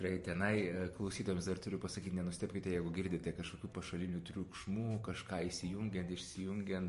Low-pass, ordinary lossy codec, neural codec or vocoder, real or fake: 14.4 kHz; MP3, 48 kbps; vocoder, 44.1 kHz, 128 mel bands every 512 samples, BigVGAN v2; fake